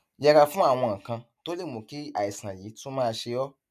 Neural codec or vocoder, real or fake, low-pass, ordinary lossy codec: none; real; 14.4 kHz; none